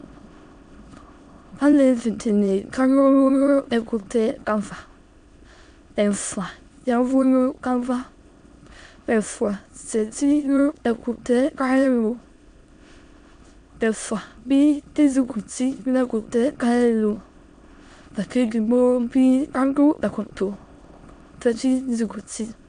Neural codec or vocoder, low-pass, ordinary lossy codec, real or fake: autoencoder, 22.05 kHz, a latent of 192 numbers a frame, VITS, trained on many speakers; 9.9 kHz; MP3, 64 kbps; fake